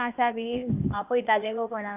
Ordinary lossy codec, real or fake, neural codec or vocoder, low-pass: none; fake; codec, 16 kHz, 0.8 kbps, ZipCodec; 3.6 kHz